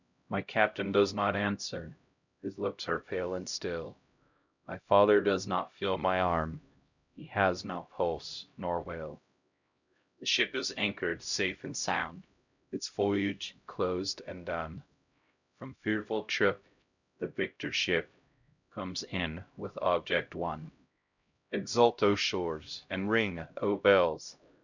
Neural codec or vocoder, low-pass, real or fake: codec, 16 kHz, 0.5 kbps, X-Codec, HuBERT features, trained on LibriSpeech; 7.2 kHz; fake